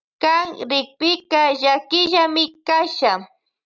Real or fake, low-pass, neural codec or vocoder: real; 7.2 kHz; none